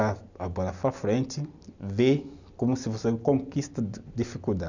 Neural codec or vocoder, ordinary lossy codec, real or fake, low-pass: none; none; real; 7.2 kHz